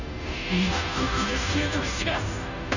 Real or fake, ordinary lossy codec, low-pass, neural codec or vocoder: fake; none; 7.2 kHz; codec, 16 kHz, 0.5 kbps, FunCodec, trained on Chinese and English, 25 frames a second